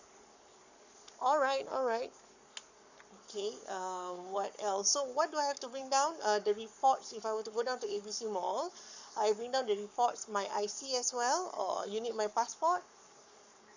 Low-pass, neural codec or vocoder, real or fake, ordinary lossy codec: 7.2 kHz; codec, 44.1 kHz, 7.8 kbps, Pupu-Codec; fake; none